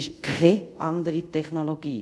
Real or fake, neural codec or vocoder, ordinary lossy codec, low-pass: fake; codec, 24 kHz, 0.5 kbps, DualCodec; none; none